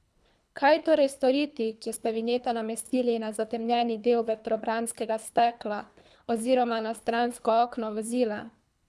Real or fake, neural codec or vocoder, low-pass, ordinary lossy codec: fake; codec, 24 kHz, 3 kbps, HILCodec; none; none